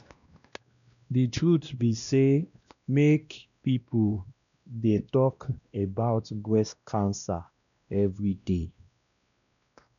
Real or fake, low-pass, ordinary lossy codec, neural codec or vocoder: fake; 7.2 kHz; none; codec, 16 kHz, 1 kbps, X-Codec, WavLM features, trained on Multilingual LibriSpeech